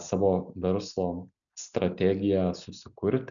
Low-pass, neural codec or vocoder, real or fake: 7.2 kHz; none; real